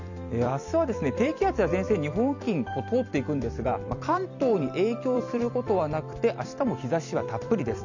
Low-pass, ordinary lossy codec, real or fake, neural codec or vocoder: 7.2 kHz; none; fake; vocoder, 44.1 kHz, 128 mel bands every 256 samples, BigVGAN v2